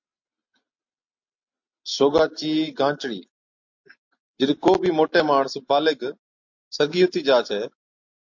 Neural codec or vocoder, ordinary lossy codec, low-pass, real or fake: none; MP3, 48 kbps; 7.2 kHz; real